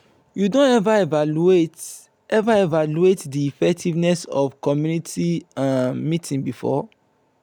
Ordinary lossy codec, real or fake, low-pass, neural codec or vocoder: none; real; 19.8 kHz; none